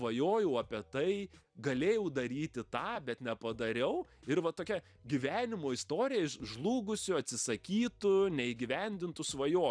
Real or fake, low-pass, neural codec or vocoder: fake; 9.9 kHz; vocoder, 44.1 kHz, 128 mel bands every 256 samples, BigVGAN v2